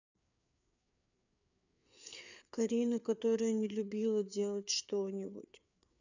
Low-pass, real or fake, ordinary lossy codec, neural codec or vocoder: 7.2 kHz; fake; none; codec, 16 kHz, 4 kbps, FreqCodec, larger model